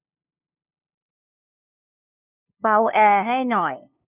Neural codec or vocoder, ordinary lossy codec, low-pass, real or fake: codec, 16 kHz, 2 kbps, FunCodec, trained on LibriTTS, 25 frames a second; none; 3.6 kHz; fake